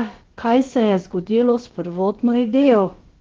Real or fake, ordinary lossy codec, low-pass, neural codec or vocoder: fake; Opus, 16 kbps; 7.2 kHz; codec, 16 kHz, about 1 kbps, DyCAST, with the encoder's durations